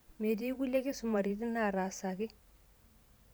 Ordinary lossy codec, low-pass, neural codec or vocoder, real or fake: none; none; vocoder, 44.1 kHz, 128 mel bands every 512 samples, BigVGAN v2; fake